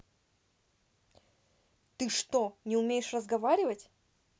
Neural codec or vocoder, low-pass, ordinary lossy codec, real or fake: none; none; none; real